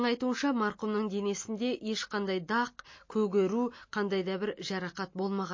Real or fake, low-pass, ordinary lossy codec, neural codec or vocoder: real; 7.2 kHz; MP3, 32 kbps; none